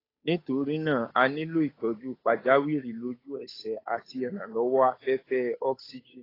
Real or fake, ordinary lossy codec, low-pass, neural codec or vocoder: fake; AAC, 24 kbps; 5.4 kHz; codec, 16 kHz, 8 kbps, FunCodec, trained on Chinese and English, 25 frames a second